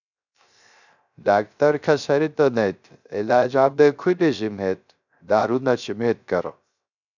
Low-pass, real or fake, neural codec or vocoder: 7.2 kHz; fake; codec, 16 kHz, 0.3 kbps, FocalCodec